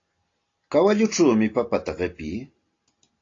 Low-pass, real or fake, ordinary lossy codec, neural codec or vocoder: 7.2 kHz; real; AAC, 32 kbps; none